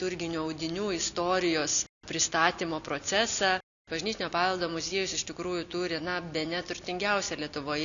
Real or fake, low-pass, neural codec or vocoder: real; 7.2 kHz; none